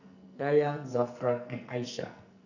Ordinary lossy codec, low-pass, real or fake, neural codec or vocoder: none; 7.2 kHz; fake; codec, 44.1 kHz, 2.6 kbps, SNAC